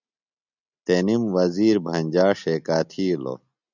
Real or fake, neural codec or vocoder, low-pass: real; none; 7.2 kHz